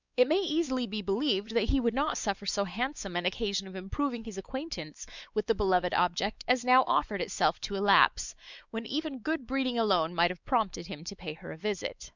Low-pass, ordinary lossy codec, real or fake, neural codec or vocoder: 7.2 kHz; Opus, 64 kbps; fake; codec, 16 kHz, 4 kbps, X-Codec, WavLM features, trained on Multilingual LibriSpeech